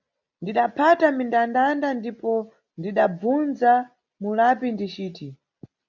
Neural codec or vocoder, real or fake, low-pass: none; real; 7.2 kHz